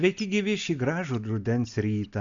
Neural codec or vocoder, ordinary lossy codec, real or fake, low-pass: none; Opus, 64 kbps; real; 7.2 kHz